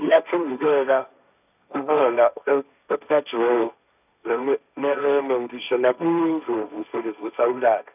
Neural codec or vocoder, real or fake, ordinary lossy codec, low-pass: codec, 16 kHz, 1.1 kbps, Voila-Tokenizer; fake; none; 3.6 kHz